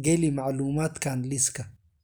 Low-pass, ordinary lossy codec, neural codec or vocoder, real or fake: none; none; none; real